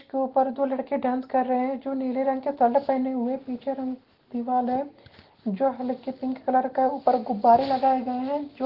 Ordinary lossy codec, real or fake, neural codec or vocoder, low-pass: Opus, 16 kbps; real; none; 5.4 kHz